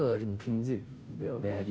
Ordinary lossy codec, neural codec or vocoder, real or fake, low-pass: none; codec, 16 kHz, 0.5 kbps, FunCodec, trained on Chinese and English, 25 frames a second; fake; none